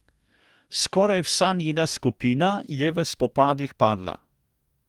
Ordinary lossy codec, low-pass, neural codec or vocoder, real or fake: Opus, 32 kbps; 19.8 kHz; codec, 44.1 kHz, 2.6 kbps, DAC; fake